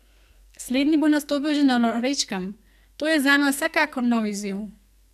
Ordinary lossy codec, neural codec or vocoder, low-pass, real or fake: none; codec, 44.1 kHz, 2.6 kbps, SNAC; 14.4 kHz; fake